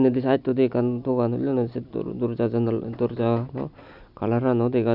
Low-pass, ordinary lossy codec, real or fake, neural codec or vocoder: 5.4 kHz; none; real; none